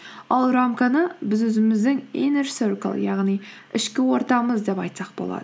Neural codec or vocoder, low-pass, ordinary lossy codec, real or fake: none; none; none; real